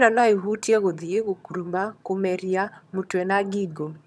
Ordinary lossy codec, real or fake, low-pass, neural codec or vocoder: none; fake; none; vocoder, 22.05 kHz, 80 mel bands, HiFi-GAN